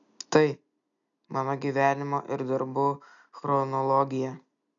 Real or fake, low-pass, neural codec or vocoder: real; 7.2 kHz; none